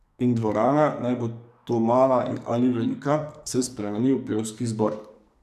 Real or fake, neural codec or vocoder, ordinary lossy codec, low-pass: fake; codec, 44.1 kHz, 2.6 kbps, SNAC; none; 14.4 kHz